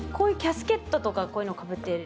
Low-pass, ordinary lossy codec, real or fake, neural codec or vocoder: none; none; real; none